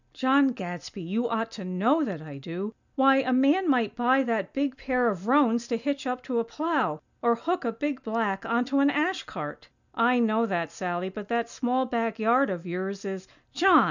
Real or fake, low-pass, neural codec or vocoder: real; 7.2 kHz; none